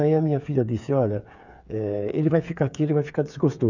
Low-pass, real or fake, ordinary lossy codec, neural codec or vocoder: 7.2 kHz; fake; none; codec, 16 kHz, 8 kbps, FreqCodec, smaller model